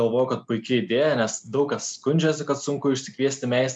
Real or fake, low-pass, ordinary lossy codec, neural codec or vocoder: real; 9.9 kHz; MP3, 96 kbps; none